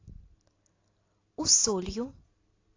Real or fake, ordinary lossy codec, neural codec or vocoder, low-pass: real; AAC, 32 kbps; none; 7.2 kHz